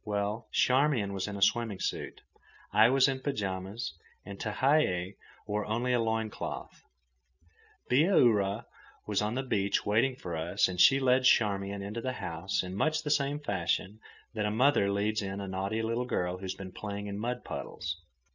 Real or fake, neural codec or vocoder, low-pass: real; none; 7.2 kHz